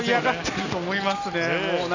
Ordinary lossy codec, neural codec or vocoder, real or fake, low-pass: none; none; real; 7.2 kHz